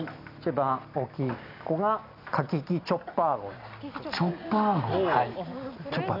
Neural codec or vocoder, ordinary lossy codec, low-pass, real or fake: none; none; 5.4 kHz; real